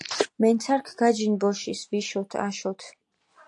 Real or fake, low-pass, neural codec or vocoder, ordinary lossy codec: real; 10.8 kHz; none; AAC, 64 kbps